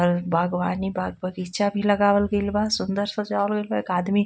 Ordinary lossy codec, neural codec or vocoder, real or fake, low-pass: none; none; real; none